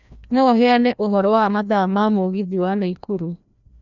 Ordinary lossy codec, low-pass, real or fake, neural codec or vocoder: none; 7.2 kHz; fake; codec, 16 kHz, 1 kbps, FreqCodec, larger model